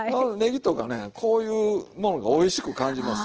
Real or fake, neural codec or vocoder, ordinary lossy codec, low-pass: real; none; Opus, 16 kbps; 7.2 kHz